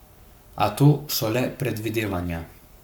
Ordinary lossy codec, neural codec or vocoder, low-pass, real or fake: none; codec, 44.1 kHz, 7.8 kbps, Pupu-Codec; none; fake